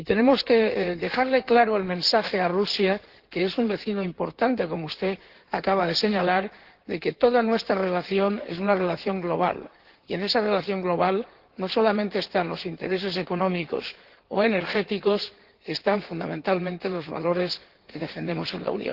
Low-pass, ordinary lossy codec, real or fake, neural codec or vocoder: 5.4 kHz; Opus, 16 kbps; fake; codec, 16 kHz in and 24 kHz out, 2.2 kbps, FireRedTTS-2 codec